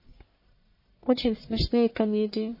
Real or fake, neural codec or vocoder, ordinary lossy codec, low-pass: fake; codec, 44.1 kHz, 1.7 kbps, Pupu-Codec; MP3, 24 kbps; 5.4 kHz